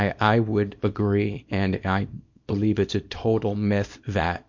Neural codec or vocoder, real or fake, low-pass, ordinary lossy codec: codec, 24 kHz, 0.9 kbps, WavTokenizer, small release; fake; 7.2 kHz; MP3, 48 kbps